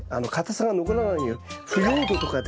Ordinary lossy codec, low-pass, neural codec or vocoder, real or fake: none; none; none; real